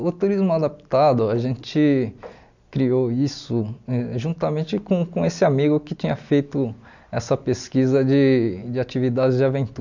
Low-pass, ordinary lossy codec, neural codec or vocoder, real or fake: 7.2 kHz; none; none; real